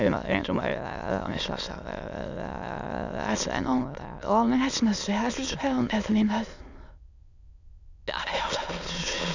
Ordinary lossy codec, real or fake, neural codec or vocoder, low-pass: none; fake; autoencoder, 22.05 kHz, a latent of 192 numbers a frame, VITS, trained on many speakers; 7.2 kHz